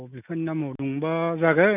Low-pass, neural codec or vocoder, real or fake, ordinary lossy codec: 3.6 kHz; none; real; none